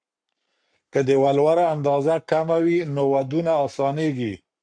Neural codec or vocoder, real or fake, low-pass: codec, 44.1 kHz, 7.8 kbps, Pupu-Codec; fake; 9.9 kHz